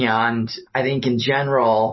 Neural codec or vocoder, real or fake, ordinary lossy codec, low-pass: none; real; MP3, 24 kbps; 7.2 kHz